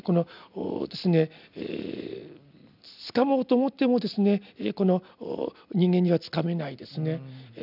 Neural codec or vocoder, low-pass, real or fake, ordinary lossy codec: none; 5.4 kHz; real; none